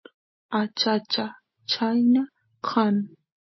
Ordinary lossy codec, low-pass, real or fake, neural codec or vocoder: MP3, 24 kbps; 7.2 kHz; real; none